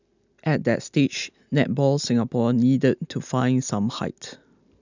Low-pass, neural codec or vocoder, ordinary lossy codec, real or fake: 7.2 kHz; none; none; real